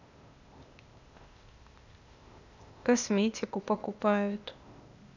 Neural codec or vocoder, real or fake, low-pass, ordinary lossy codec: codec, 16 kHz, 0.8 kbps, ZipCodec; fake; 7.2 kHz; none